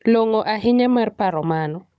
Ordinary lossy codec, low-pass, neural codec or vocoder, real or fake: none; none; codec, 16 kHz, 16 kbps, FunCodec, trained on Chinese and English, 50 frames a second; fake